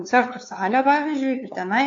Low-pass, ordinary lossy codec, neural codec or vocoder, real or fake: 7.2 kHz; AAC, 48 kbps; codec, 16 kHz, 2 kbps, FunCodec, trained on LibriTTS, 25 frames a second; fake